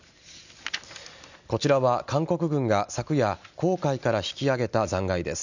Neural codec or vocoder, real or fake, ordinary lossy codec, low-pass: none; real; none; 7.2 kHz